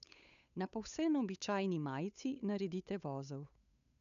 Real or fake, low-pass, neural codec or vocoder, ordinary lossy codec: fake; 7.2 kHz; codec, 16 kHz, 8 kbps, FunCodec, trained on Chinese and English, 25 frames a second; none